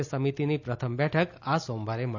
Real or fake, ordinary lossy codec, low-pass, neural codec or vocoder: real; none; 7.2 kHz; none